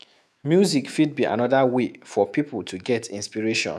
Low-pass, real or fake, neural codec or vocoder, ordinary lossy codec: 14.4 kHz; fake; autoencoder, 48 kHz, 128 numbers a frame, DAC-VAE, trained on Japanese speech; none